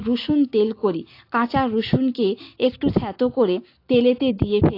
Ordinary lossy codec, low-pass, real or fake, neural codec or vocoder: AAC, 32 kbps; 5.4 kHz; real; none